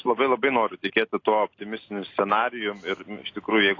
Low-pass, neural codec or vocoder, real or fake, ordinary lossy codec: 7.2 kHz; none; real; AAC, 32 kbps